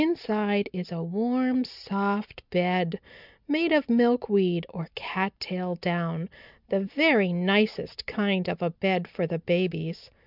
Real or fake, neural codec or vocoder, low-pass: real; none; 5.4 kHz